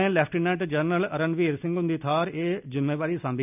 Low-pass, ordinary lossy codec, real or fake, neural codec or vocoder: 3.6 kHz; none; real; none